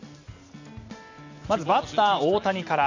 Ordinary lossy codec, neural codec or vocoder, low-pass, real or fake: AAC, 48 kbps; none; 7.2 kHz; real